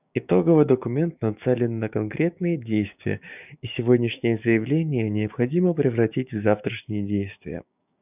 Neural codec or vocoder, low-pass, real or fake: codec, 16 kHz, 6 kbps, DAC; 3.6 kHz; fake